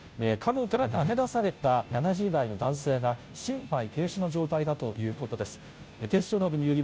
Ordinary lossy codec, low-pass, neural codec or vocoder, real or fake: none; none; codec, 16 kHz, 0.5 kbps, FunCodec, trained on Chinese and English, 25 frames a second; fake